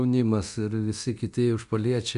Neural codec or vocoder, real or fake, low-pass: codec, 24 kHz, 0.9 kbps, DualCodec; fake; 10.8 kHz